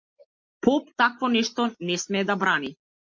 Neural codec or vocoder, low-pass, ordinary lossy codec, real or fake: none; 7.2 kHz; MP3, 48 kbps; real